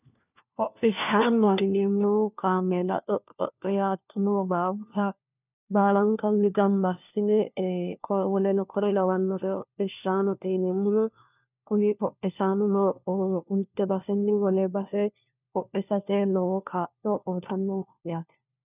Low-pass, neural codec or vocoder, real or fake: 3.6 kHz; codec, 16 kHz, 1 kbps, FunCodec, trained on LibriTTS, 50 frames a second; fake